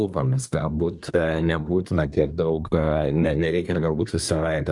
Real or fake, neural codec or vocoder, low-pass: fake; codec, 24 kHz, 1 kbps, SNAC; 10.8 kHz